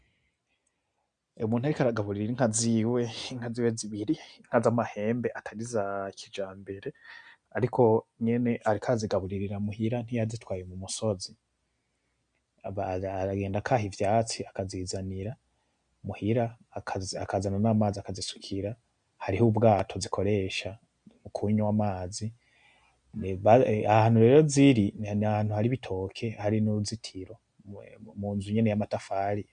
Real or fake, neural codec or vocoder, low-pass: real; none; 9.9 kHz